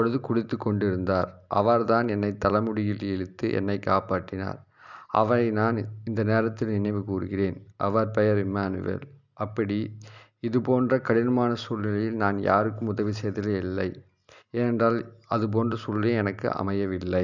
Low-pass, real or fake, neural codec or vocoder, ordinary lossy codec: 7.2 kHz; real; none; none